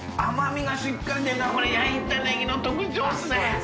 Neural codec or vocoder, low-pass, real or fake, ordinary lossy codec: none; none; real; none